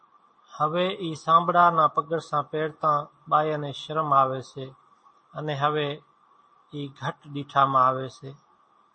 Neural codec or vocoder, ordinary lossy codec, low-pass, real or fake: none; MP3, 32 kbps; 9.9 kHz; real